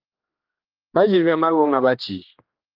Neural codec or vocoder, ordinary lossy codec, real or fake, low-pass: codec, 16 kHz, 2 kbps, X-Codec, HuBERT features, trained on general audio; Opus, 32 kbps; fake; 5.4 kHz